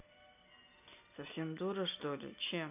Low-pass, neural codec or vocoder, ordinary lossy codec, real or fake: 3.6 kHz; none; none; real